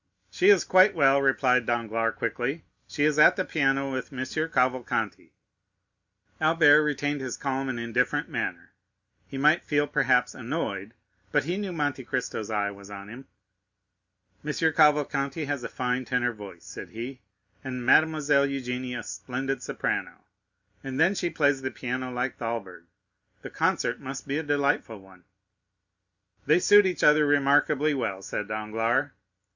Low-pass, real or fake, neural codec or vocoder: 7.2 kHz; real; none